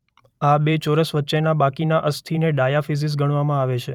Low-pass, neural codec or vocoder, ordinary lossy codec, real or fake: 19.8 kHz; vocoder, 44.1 kHz, 128 mel bands every 512 samples, BigVGAN v2; none; fake